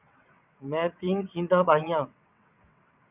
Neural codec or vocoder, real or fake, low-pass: vocoder, 22.05 kHz, 80 mel bands, WaveNeXt; fake; 3.6 kHz